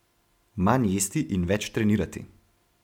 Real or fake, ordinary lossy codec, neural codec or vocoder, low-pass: real; MP3, 96 kbps; none; 19.8 kHz